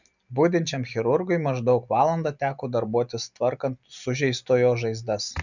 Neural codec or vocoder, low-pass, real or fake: none; 7.2 kHz; real